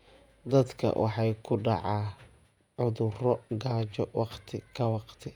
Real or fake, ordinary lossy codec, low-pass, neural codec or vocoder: fake; none; 19.8 kHz; vocoder, 48 kHz, 128 mel bands, Vocos